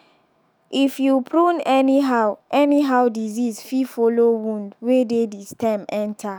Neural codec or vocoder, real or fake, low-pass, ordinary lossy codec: autoencoder, 48 kHz, 128 numbers a frame, DAC-VAE, trained on Japanese speech; fake; none; none